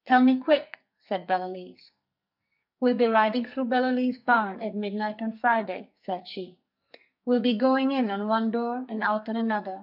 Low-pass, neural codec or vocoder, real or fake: 5.4 kHz; codec, 44.1 kHz, 2.6 kbps, SNAC; fake